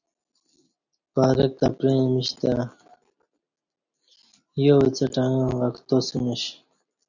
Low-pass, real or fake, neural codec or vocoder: 7.2 kHz; real; none